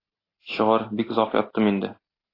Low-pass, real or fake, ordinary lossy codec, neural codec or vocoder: 5.4 kHz; real; AAC, 24 kbps; none